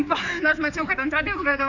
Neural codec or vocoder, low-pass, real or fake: codec, 16 kHz, 4 kbps, X-Codec, HuBERT features, trained on general audio; 7.2 kHz; fake